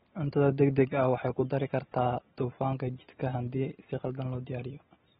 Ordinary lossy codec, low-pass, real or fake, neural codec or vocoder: AAC, 16 kbps; 7.2 kHz; real; none